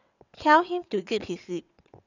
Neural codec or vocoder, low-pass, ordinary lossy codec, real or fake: vocoder, 22.05 kHz, 80 mel bands, WaveNeXt; 7.2 kHz; none; fake